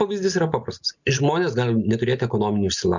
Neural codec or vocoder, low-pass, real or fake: none; 7.2 kHz; real